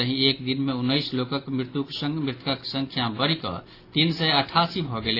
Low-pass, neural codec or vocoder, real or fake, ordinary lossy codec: 5.4 kHz; none; real; AAC, 32 kbps